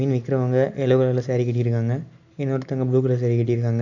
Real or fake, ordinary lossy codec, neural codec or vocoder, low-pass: real; none; none; 7.2 kHz